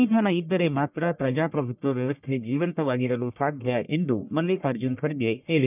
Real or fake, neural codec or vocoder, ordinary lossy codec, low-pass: fake; codec, 44.1 kHz, 1.7 kbps, Pupu-Codec; none; 3.6 kHz